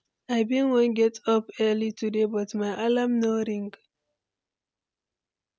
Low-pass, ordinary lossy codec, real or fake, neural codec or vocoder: none; none; real; none